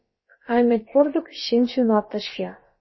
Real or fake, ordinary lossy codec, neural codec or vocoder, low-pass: fake; MP3, 24 kbps; codec, 16 kHz, about 1 kbps, DyCAST, with the encoder's durations; 7.2 kHz